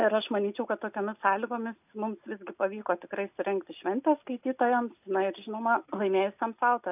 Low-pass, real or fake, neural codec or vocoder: 3.6 kHz; real; none